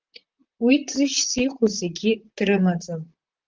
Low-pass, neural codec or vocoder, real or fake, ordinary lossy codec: 7.2 kHz; vocoder, 44.1 kHz, 128 mel bands, Pupu-Vocoder; fake; Opus, 24 kbps